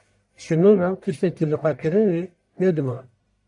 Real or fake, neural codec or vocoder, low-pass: fake; codec, 44.1 kHz, 1.7 kbps, Pupu-Codec; 10.8 kHz